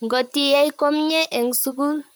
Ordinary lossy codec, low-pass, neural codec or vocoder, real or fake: none; none; vocoder, 44.1 kHz, 128 mel bands, Pupu-Vocoder; fake